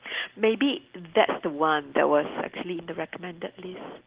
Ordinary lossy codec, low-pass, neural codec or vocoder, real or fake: Opus, 16 kbps; 3.6 kHz; none; real